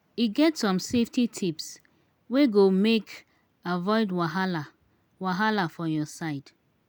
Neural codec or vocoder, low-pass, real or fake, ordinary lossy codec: none; none; real; none